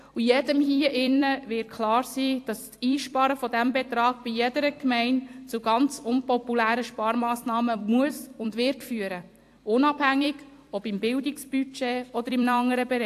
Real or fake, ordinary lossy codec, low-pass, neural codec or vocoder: fake; AAC, 64 kbps; 14.4 kHz; vocoder, 44.1 kHz, 128 mel bands every 256 samples, BigVGAN v2